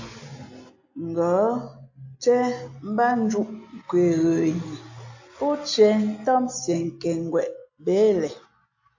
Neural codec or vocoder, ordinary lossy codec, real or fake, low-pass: none; AAC, 48 kbps; real; 7.2 kHz